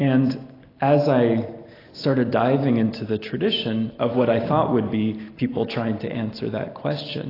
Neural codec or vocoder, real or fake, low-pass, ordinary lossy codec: none; real; 5.4 kHz; AAC, 24 kbps